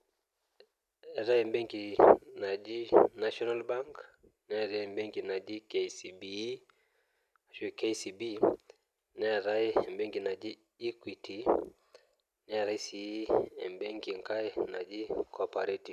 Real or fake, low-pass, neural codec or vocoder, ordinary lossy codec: real; 14.4 kHz; none; none